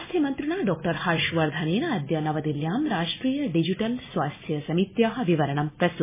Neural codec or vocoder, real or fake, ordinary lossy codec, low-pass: none; real; MP3, 16 kbps; 3.6 kHz